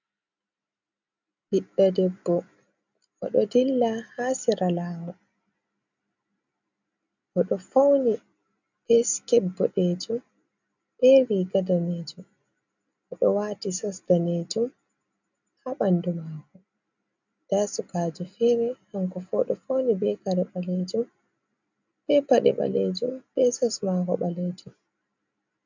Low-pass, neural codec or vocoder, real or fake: 7.2 kHz; none; real